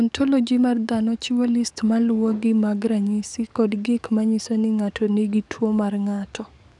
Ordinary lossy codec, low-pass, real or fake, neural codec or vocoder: none; 10.8 kHz; fake; codec, 44.1 kHz, 7.8 kbps, DAC